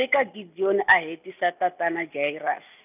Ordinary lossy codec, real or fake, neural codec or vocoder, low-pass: none; real; none; 3.6 kHz